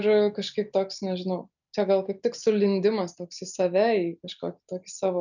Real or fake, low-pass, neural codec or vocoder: real; 7.2 kHz; none